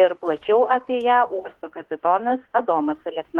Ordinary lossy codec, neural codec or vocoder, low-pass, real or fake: Opus, 16 kbps; autoencoder, 48 kHz, 32 numbers a frame, DAC-VAE, trained on Japanese speech; 19.8 kHz; fake